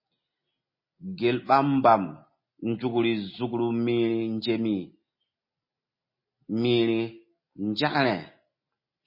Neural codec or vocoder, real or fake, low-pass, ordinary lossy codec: none; real; 5.4 kHz; MP3, 24 kbps